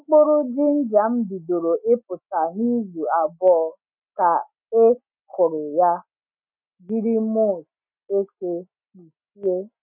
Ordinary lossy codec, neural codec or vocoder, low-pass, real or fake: none; none; 3.6 kHz; real